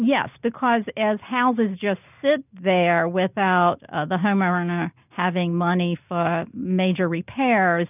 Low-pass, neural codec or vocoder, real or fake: 3.6 kHz; none; real